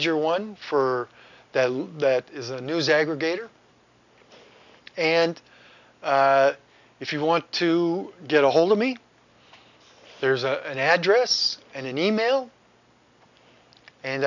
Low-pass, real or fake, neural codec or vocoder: 7.2 kHz; real; none